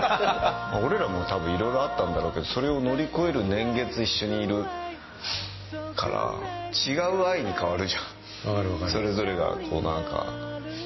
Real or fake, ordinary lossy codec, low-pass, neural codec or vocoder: real; MP3, 24 kbps; 7.2 kHz; none